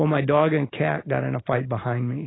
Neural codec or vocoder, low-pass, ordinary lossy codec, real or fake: none; 7.2 kHz; AAC, 16 kbps; real